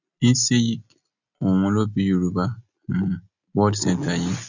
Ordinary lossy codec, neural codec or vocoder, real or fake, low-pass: none; none; real; 7.2 kHz